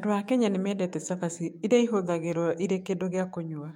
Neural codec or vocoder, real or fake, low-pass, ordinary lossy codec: autoencoder, 48 kHz, 128 numbers a frame, DAC-VAE, trained on Japanese speech; fake; 19.8 kHz; MP3, 64 kbps